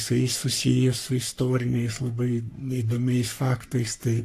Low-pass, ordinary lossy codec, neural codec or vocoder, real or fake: 14.4 kHz; AAC, 64 kbps; codec, 44.1 kHz, 3.4 kbps, Pupu-Codec; fake